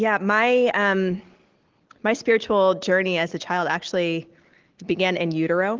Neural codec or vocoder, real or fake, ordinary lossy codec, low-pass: none; real; Opus, 16 kbps; 7.2 kHz